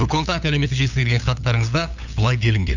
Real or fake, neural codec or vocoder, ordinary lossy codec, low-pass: fake; codec, 16 kHz, 8 kbps, FunCodec, trained on LibriTTS, 25 frames a second; none; 7.2 kHz